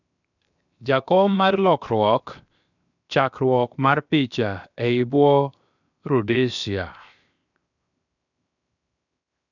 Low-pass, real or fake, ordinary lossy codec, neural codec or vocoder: 7.2 kHz; fake; none; codec, 16 kHz, 0.7 kbps, FocalCodec